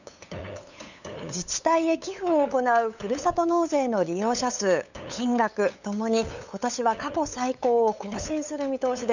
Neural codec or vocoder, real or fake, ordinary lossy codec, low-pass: codec, 16 kHz, 8 kbps, FunCodec, trained on LibriTTS, 25 frames a second; fake; none; 7.2 kHz